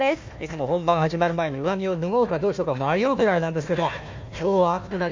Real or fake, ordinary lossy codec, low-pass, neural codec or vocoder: fake; MP3, 48 kbps; 7.2 kHz; codec, 16 kHz, 1 kbps, FunCodec, trained on Chinese and English, 50 frames a second